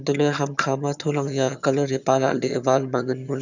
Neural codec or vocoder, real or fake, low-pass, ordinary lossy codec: vocoder, 22.05 kHz, 80 mel bands, HiFi-GAN; fake; 7.2 kHz; none